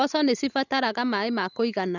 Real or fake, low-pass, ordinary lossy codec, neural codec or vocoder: real; 7.2 kHz; none; none